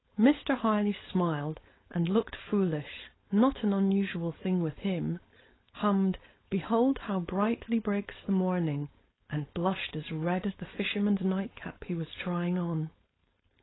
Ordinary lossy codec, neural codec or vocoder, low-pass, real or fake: AAC, 16 kbps; codec, 16 kHz, 4.8 kbps, FACodec; 7.2 kHz; fake